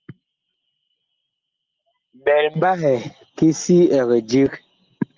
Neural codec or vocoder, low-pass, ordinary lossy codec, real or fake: none; 7.2 kHz; Opus, 32 kbps; real